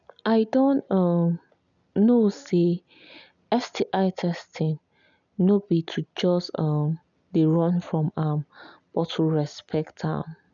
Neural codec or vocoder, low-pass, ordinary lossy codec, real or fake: none; 7.2 kHz; none; real